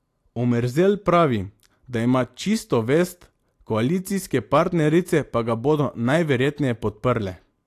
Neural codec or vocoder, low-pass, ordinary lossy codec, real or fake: none; 14.4 kHz; AAC, 64 kbps; real